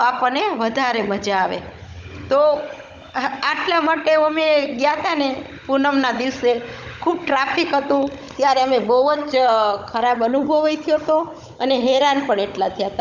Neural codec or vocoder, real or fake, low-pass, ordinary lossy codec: codec, 16 kHz, 16 kbps, FunCodec, trained on Chinese and English, 50 frames a second; fake; none; none